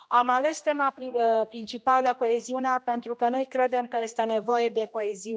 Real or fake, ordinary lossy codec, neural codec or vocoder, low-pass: fake; none; codec, 16 kHz, 1 kbps, X-Codec, HuBERT features, trained on general audio; none